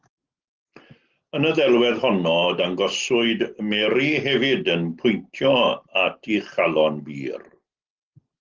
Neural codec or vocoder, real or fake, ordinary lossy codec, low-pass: none; real; Opus, 16 kbps; 7.2 kHz